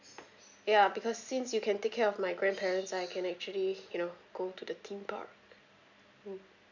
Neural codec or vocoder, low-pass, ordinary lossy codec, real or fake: none; 7.2 kHz; none; real